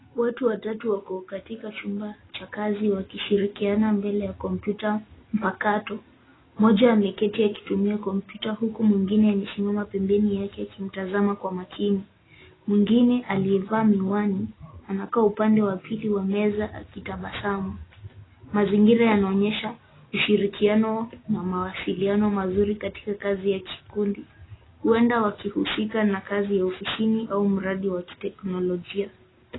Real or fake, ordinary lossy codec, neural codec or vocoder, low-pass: real; AAC, 16 kbps; none; 7.2 kHz